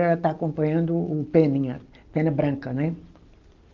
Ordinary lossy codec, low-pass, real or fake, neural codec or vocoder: Opus, 24 kbps; 7.2 kHz; real; none